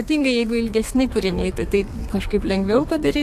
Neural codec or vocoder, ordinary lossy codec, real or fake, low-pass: codec, 32 kHz, 1.9 kbps, SNAC; AAC, 64 kbps; fake; 14.4 kHz